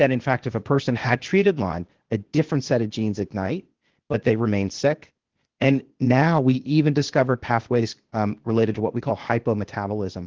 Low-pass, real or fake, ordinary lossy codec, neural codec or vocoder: 7.2 kHz; fake; Opus, 16 kbps; codec, 16 kHz, 0.8 kbps, ZipCodec